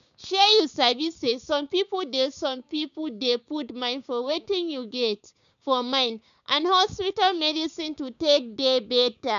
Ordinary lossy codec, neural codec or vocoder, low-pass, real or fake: none; codec, 16 kHz, 6 kbps, DAC; 7.2 kHz; fake